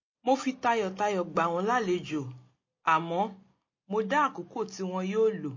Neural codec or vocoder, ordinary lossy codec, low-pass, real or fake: none; MP3, 32 kbps; 7.2 kHz; real